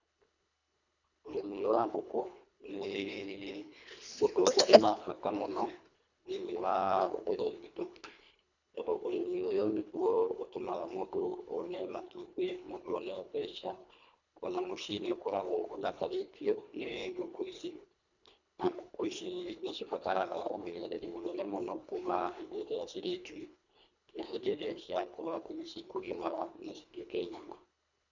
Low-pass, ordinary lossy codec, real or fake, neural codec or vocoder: 7.2 kHz; none; fake; codec, 24 kHz, 1.5 kbps, HILCodec